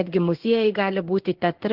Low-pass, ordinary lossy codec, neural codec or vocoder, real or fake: 5.4 kHz; Opus, 16 kbps; codec, 16 kHz in and 24 kHz out, 1 kbps, XY-Tokenizer; fake